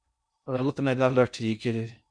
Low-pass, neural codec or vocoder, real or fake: 9.9 kHz; codec, 16 kHz in and 24 kHz out, 0.6 kbps, FocalCodec, streaming, 2048 codes; fake